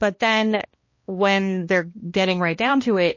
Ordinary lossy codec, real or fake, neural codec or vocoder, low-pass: MP3, 32 kbps; fake; codec, 16 kHz, 1 kbps, X-Codec, HuBERT features, trained on balanced general audio; 7.2 kHz